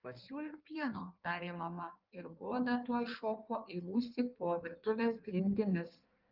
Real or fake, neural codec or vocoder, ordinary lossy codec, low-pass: fake; codec, 16 kHz in and 24 kHz out, 1.1 kbps, FireRedTTS-2 codec; Opus, 32 kbps; 5.4 kHz